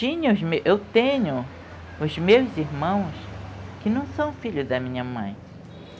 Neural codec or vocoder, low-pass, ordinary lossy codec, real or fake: none; none; none; real